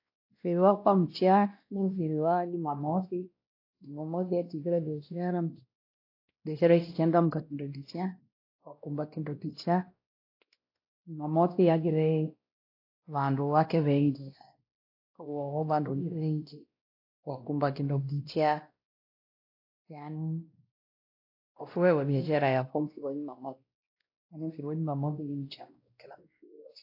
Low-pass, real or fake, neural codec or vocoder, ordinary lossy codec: 5.4 kHz; fake; codec, 16 kHz, 1 kbps, X-Codec, WavLM features, trained on Multilingual LibriSpeech; AAC, 32 kbps